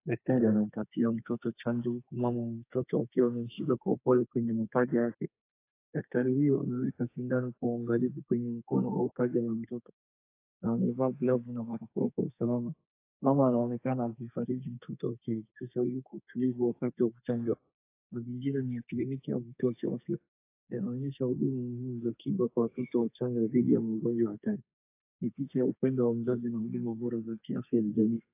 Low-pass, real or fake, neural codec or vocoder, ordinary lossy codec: 3.6 kHz; fake; codec, 32 kHz, 1.9 kbps, SNAC; AAC, 24 kbps